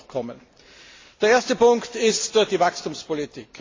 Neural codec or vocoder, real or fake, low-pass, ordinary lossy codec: none; real; 7.2 kHz; AAC, 32 kbps